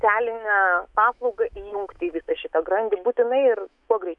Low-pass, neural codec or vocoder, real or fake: 10.8 kHz; autoencoder, 48 kHz, 128 numbers a frame, DAC-VAE, trained on Japanese speech; fake